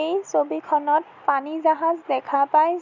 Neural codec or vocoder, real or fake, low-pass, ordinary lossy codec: none; real; 7.2 kHz; none